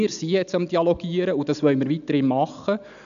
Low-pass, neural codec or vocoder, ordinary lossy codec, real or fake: 7.2 kHz; none; none; real